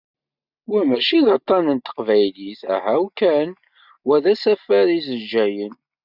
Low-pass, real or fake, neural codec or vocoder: 5.4 kHz; real; none